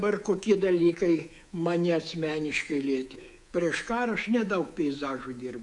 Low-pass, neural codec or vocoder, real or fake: 10.8 kHz; codec, 24 kHz, 3.1 kbps, DualCodec; fake